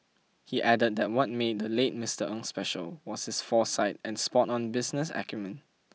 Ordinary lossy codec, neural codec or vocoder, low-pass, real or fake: none; none; none; real